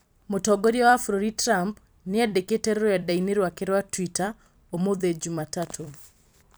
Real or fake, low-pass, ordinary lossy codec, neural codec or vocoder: fake; none; none; vocoder, 44.1 kHz, 128 mel bands every 256 samples, BigVGAN v2